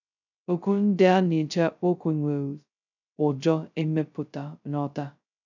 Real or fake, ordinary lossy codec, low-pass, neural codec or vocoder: fake; none; 7.2 kHz; codec, 16 kHz, 0.2 kbps, FocalCodec